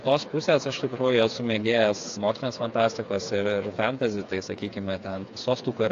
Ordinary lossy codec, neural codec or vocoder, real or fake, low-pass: AAC, 48 kbps; codec, 16 kHz, 4 kbps, FreqCodec, smaller model; fake; 7.2 kHz